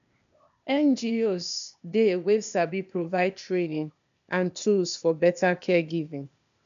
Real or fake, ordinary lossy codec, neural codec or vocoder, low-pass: fake; none; codec, 16 kHz, 0.8 kbps, ZipCodec; 7.2 kHz